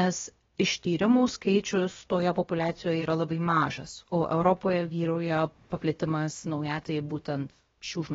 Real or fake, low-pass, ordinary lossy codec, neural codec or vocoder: fake; 7.2 kHz; AAC, 24 kbps; codec, 16 kHz, about 1 kbps, DyCAST, with the encoder's durations